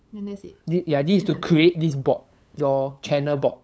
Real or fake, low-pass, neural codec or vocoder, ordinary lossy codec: fake; none; codec, 16 kHz, 8 kbps, FunCodec, trained on LibriTTS, 25 frames a second; none